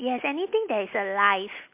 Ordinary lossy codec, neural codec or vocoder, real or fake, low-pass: MP3, 32 kbps; none; real; 3.6 kHz